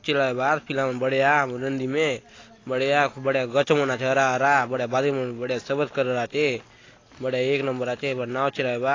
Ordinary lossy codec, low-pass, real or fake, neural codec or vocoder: AAC, 32 kbps; 7.2 kHz; real; none